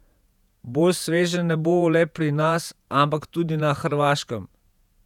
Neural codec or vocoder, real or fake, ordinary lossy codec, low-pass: vocoder, 48 kHz, 128 mel bands, Vocos; fake; none; 19.8 kHz